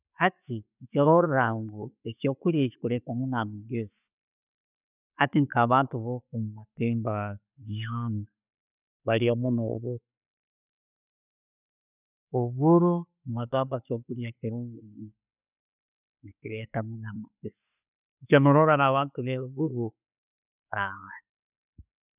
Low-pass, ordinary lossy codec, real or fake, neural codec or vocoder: 3.6 kHz; none; real; none